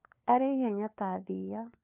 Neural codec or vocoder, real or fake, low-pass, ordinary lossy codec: codec, 16 kHz, 4 kbps, FunCodec, trained on LibriTTS, 50 frames a second; fake; 3.6 kHz; none